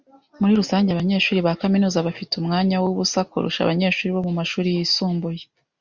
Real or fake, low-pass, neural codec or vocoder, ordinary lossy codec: real; 7.2 kHz; none; Opus, 64 kbps